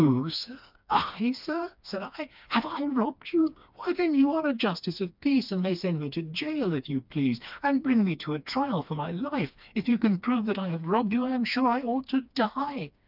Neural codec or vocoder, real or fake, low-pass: codec, 16 kHz, 2 kbps, FreqCodec, smaller model; fake; 5.4 kHz